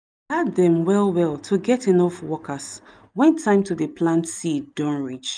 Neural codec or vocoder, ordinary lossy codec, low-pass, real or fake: none; none; 9.9 kHz; real